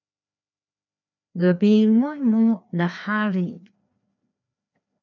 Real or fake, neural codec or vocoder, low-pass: fake; codec, 16 kHz, 2 kbps, FreqCodec, larger model; 7.2 kHz